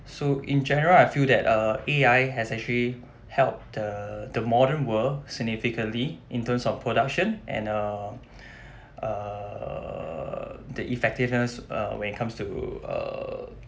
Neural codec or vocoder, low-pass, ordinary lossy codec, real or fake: none; none; none; real